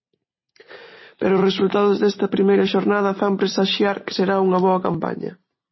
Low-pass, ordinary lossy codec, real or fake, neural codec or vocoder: 7.2 kHz; MP3, 24 kbps; real; none